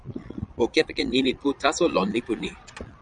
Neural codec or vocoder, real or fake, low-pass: vocoder, 22.05 kHz, 80 mel bands, Vocos; fake; 9.9 kHz